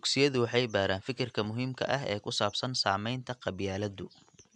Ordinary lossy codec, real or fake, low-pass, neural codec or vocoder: MP3, 96 kbps; real; 10.8 kHz; none